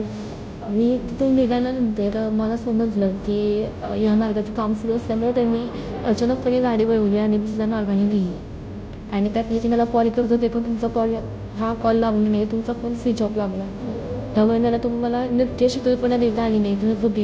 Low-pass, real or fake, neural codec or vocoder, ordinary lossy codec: none; fake; codec, 16 kHz, 0.5 kbps, FunCodec, trained on Chinese and English, 25 frames a second; none